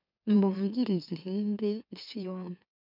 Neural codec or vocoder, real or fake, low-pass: autoencoder, 44.1 kHz, a latent of 192 numbers a frame, MeloTTS; fake; 5.4 kHz